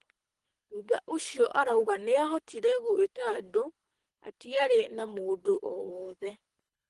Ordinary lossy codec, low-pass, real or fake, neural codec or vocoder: Opus, 24 kbps; 10.8 kHz; fake; codec, 24 kHz, 3 kbps, HILCodec